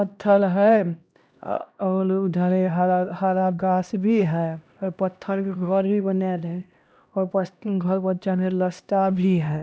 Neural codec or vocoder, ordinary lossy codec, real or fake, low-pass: codec, 16 kHz, 1 kbps, X-Codec, HuBERT features, trained on LibriSpeech; none; fake; none